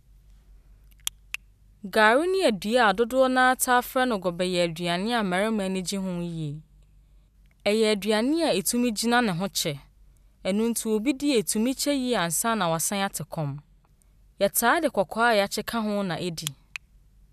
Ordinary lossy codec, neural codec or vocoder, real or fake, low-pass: none; none; real; 14.4 kHz